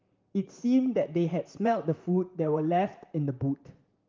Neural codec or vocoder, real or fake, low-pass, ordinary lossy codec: vocoder, 44.1 kHz, 128 mel bands, Pupu-Vocoder; fake; 7.2 kHz; Opus, 24 kbps